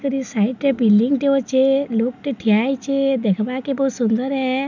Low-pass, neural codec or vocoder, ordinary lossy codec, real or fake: 7.2 kHz; none; none; real